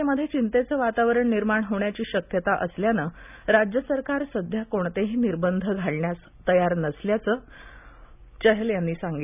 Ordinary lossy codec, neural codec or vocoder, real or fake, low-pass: none; none; real; 3.6 kHz